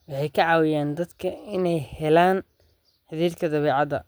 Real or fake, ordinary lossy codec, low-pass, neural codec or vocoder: real; none; none; none